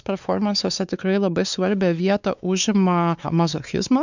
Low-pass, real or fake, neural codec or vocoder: 7.2 kHz; fake; codec, 16 kHz, 4 kbps, X-Codec, WavLM features, trained on Multilingual LibriSpeech